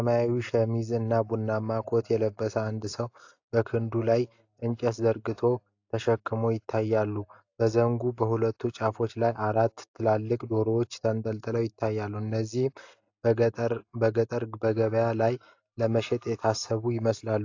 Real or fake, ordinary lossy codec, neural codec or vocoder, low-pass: real; AAC, 48 kbps; none; 7.2 kHz